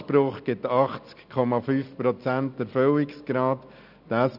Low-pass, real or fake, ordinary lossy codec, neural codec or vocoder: 5.4 kHz; real; none; none